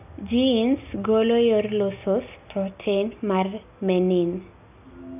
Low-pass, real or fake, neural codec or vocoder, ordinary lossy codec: 3.6 kHz; real; none; none